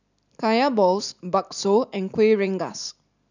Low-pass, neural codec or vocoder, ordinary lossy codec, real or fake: 7.2 kHz; none; none; real